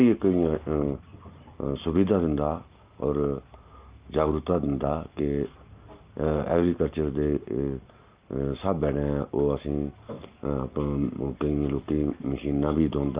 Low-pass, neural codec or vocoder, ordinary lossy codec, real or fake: 3.6 kHz; none; Opus, 32 kbps; real